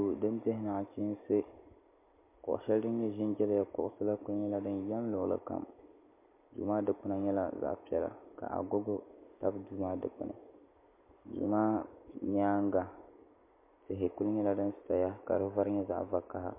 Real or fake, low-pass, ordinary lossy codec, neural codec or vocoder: real; 3.6 kHz; MP3, 24 kbps; none